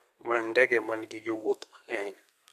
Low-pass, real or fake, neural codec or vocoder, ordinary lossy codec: 14.4 kHz; fake; codec, 32 kHz, 1.9 kbps, SNAC; MP3, 96 kbps